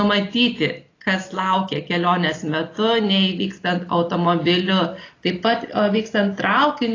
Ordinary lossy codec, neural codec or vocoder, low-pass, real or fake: AAC, 32 kbps; none; 7.2 kHz; real